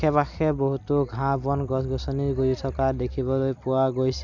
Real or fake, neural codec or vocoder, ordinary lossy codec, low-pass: real; none; none; 7.2 kHz